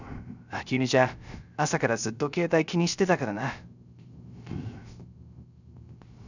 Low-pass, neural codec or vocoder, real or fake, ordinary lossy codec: 7.2 kHz; codec, 16 kHz, 0.3 kbps, FocalCodec; fake; none